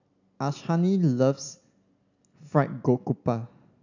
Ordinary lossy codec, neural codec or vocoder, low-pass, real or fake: none; none; 7.2 kHz; real